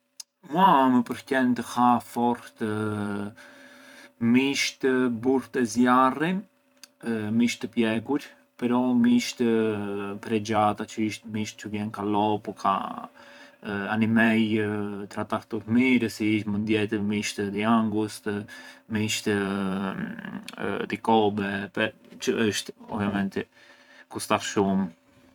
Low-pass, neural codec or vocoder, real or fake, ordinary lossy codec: 19.8 kHz; vocoder, 44.1 kHz, 128 mel bands every 512 samples, BigVGAN v2; fake; none